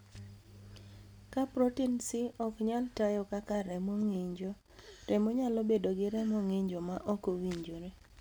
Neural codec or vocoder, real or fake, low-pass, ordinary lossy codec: none; real; none; none